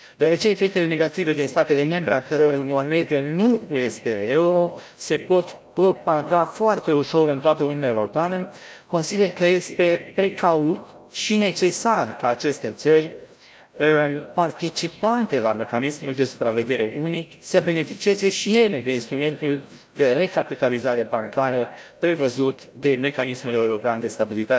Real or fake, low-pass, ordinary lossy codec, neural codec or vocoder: fake; none; none; codec, 16 kHz, 0.5 kbps, FreqCodec, larger model